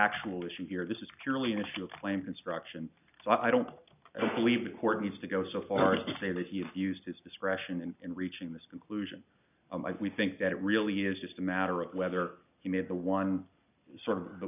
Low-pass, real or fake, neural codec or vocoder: 3.6 kHz; real; none